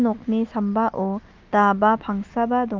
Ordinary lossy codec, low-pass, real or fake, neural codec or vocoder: Opus, 24 kbps; 7.2 kHz; real; none